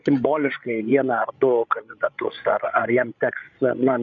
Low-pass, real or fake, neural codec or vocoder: 7.2 kHz; fake; codec, 16 kHz, 8 kbps, FreqCodec, larger model